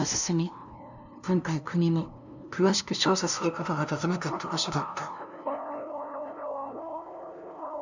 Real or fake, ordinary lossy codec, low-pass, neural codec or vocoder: fake; none; 7.2 kHz; codec, 16 kHz, 0.5 kbps, FunCodec, trained on LibriTTS, 25 frames a second